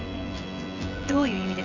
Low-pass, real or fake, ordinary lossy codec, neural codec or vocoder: 7.2 kHz; fake; none; vocoder, 24 kHz, 100 mel bands, Vocos